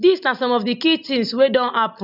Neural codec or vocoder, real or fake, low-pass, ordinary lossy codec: none; real; 5.4 kHz; none